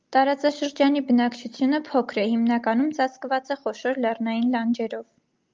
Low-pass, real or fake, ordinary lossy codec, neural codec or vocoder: 7.2 kHz; real; Opus, 24 kbps; none